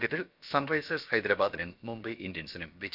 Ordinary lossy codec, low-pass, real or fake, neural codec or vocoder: none; 5.4 kHz; fake; codec, 16 kHz, about 1 kbps, DyCAST, with the encoder's durations